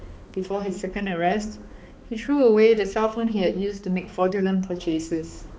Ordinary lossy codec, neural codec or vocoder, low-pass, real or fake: none; codec, 16 kHz, 2 kbps, X-Codec, HuBERT features, trained on balanced general audio; none; fake